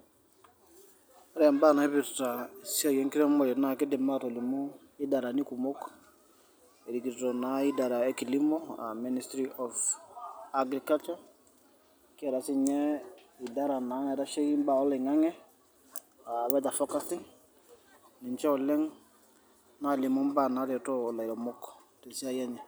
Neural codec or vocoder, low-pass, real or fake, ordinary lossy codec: none; none; real; none